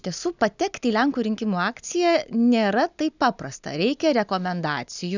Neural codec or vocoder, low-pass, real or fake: none; 7.2 kHz; real